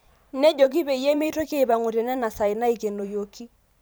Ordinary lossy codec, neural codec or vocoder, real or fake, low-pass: none; vocoder, 44.1 kHz, 128 mel bands every 512 samples, BigVGAN v2; fake; none